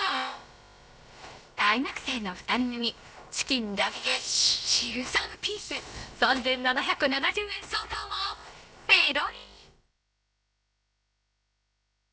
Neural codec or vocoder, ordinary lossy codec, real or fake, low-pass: codec, 16 kHz, about 1 kbps, DyCAST, with the encoder's durations; none; fake; none